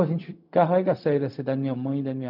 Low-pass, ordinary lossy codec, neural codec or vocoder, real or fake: 5.4 kHz; none; codec, 16 kHz, 0.4 kbps, LongCat-Audio-Codec; fake